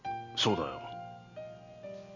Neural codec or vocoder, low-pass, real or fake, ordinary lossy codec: none; 7.2 kHz; real; none